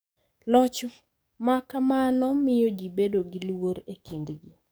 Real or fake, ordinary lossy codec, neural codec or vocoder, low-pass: fake; none; codec, 44.1 kHz, 7.8 kbps, DAC; none